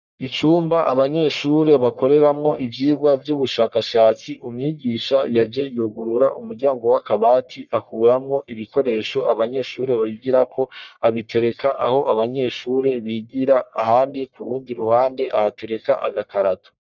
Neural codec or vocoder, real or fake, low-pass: codec, 44.1 kHz, 1.7 kbps, Pupu-Codec; fake; 7.2 kHz